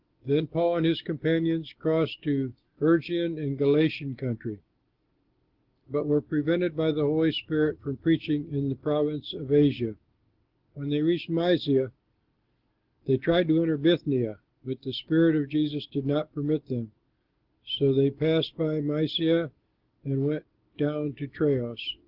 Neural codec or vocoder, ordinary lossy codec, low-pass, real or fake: none; Opus, 16 kbps; 5.4 kHz; real